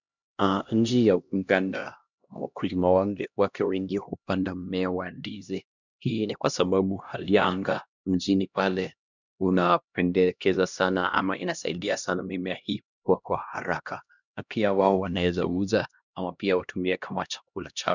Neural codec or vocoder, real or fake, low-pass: codec, 16 kHz, 1 kbps, X-Codec, HuBERT features, trained on LibriSpeech; fake; 7.2 kHz